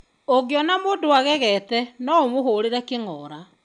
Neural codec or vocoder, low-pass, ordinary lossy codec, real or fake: none; 9.9 kHz; none; real